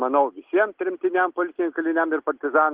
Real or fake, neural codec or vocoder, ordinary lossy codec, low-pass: real; none; Opus, 16 kbps; 3.6 kHz